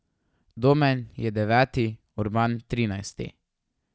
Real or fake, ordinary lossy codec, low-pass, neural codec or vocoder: real; none; none; none